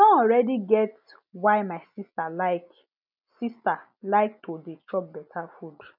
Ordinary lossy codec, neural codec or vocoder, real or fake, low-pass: none; none; real; 5.4 kHz